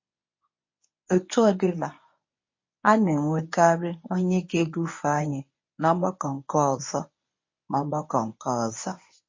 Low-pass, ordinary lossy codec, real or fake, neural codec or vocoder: 7.2 kHz; MP3, 32 kbps; fake; codec, 24 kHz, 0.9 kbps, WavTokenizer, medium speech release version 1